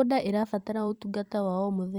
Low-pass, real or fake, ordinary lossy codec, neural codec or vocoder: 19.8 kHz; fake; Opus, 64 kbps; vocoder, 44.1 kHz, 128 mel bands every 256 samples, BigVGAN v2